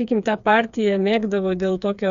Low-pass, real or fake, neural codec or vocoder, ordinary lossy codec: 7.2 kHz; fake; codec, 16 kHz, 4 kbps, FreqCodec, smaller model; Opus, 64 kbps